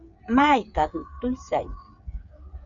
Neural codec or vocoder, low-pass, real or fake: codec, 16 kHz, 8 kbps, FreqCodec, smaller model; 7.2 kHz; fake